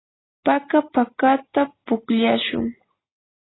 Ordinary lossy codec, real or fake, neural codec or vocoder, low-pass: AAC, 16 kbps; real; none; 7.2 kHz